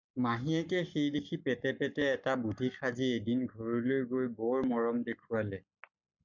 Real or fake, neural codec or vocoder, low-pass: fake; codec, 44.1 kHz, 7.8 kbps, Pupu-Codec; 7.2 kHz